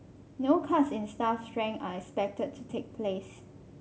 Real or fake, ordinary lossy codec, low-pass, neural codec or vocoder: real; none; none; none